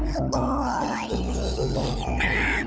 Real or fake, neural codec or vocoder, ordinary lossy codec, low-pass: fake; codec, 16 kHz, 16 kbps, FunCodec, trained on LibriTTS, 50 frames a second; none; none